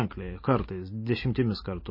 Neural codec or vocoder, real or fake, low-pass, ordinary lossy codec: none; real; 5.4 kHz; MP3, 24 kbps